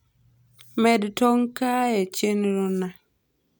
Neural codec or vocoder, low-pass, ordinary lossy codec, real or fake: none; none; none; real